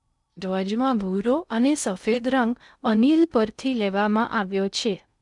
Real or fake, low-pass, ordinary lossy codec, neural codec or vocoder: fake; 10.8 kHz; none; codec, 16 kHz in and 24 kHz out, 0.6 kbps, FocalCodec, streaming, 2048 codes